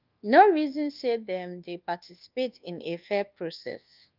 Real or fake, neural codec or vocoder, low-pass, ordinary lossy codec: fake; codec, 24 kHz, 1.2 kbps, DualCodec; 5.4 kHz; Opus, 24 kbps